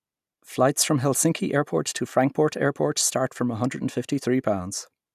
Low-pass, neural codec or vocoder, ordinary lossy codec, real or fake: 14.4 kHz; none; none; real